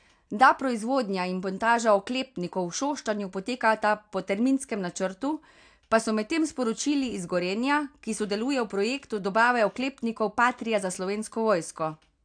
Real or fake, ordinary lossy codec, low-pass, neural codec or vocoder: real; AAC, 64 kbps; 9.9 kHz; none